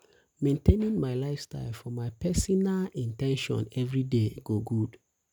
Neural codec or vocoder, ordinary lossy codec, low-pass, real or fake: none; none; none; real